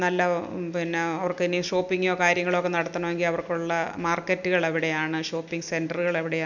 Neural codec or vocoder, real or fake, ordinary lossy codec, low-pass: none; real; none; 7.2 kHz